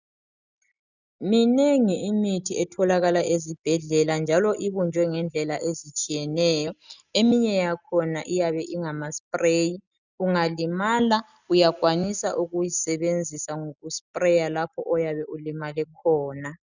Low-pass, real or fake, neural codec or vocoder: 7.2 kHz; real; none